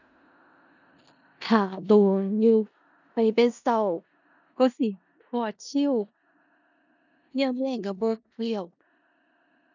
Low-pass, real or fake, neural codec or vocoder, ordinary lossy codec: 7.2 kHz; fake; codec, 16 kHz in and 24 kHz out, 0.4 kbps, LongCat-Audio-Codec, four codebook decoder; none